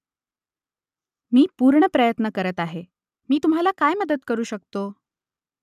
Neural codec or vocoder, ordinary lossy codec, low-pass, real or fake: none; none; 14.4 kHz; real